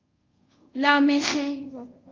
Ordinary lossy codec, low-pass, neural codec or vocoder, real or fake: Opus, 24 kbps; 7.2 kHz; codec, 24 kHz, 0.5 kbps, DualCodec; fake